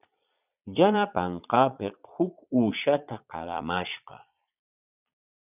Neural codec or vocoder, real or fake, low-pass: vocoder, 22.05 kHz, 80 mel bands, Vocos; fake; 3.6 kHz